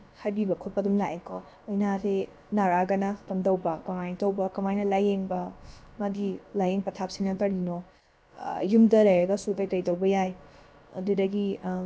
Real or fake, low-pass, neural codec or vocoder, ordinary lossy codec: fake; none; codec, 16 kHz, about 1 kbps, DyCAST, with the encoder's durations; none